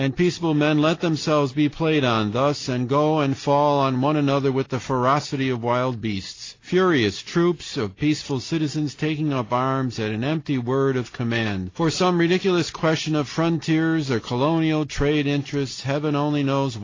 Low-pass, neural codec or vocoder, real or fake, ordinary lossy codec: 7.2 kHz; none; real; AAC, 32 kbps